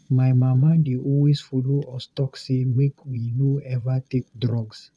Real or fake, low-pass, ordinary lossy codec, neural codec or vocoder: fake; none; none; vocoder, 22.05 kHz, 80 mel bands, Vocos